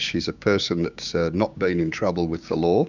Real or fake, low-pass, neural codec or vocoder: fake; 7.2 kHz; codec, 16 kHz, 6 kbps, DAC